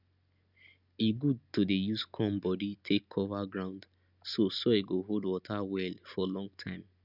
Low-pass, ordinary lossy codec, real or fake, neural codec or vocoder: 5.4 kHz; none; real; none